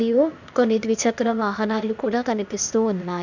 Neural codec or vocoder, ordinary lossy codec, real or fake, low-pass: codec, 16 kHz, 0.8 kbps, ZipCodec; none; fake; 7.2 kHz